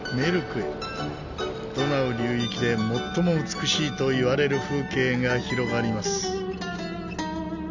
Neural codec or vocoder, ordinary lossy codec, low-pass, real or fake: none; none; 7.2 kHz; real